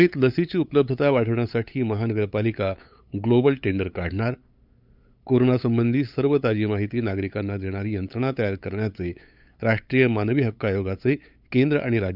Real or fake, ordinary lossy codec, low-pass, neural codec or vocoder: fake; Opus, 64 kbps; 5.4 kHz; codec, 16 kHz, 8 kbps, FunCodec, trained on LibriTTS, 25 frames a second